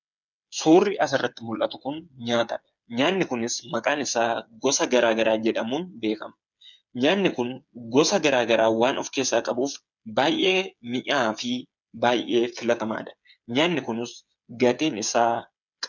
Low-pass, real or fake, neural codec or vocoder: 7.2 kHz; fake; codec, 16 kHz, 8 kbps, FreqCodec, smaller model